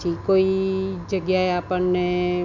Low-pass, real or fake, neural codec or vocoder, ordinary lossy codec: 7.2 kHz; real; none; none